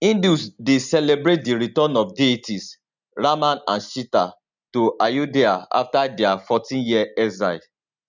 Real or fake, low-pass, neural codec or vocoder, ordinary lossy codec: real; 7.2 kHz; none; none